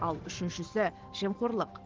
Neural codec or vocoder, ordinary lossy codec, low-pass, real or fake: none; Opus, 16 kbps; 7.2 kHz; real